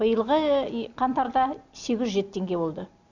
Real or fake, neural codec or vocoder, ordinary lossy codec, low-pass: real; none; none; 7.2 kHz